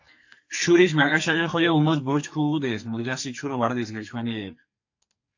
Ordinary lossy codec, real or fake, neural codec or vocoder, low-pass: AAC, 48 kbps; fake; codec, 44.1 kHz, 2.6 kbps, SNAC; 7.2 kHz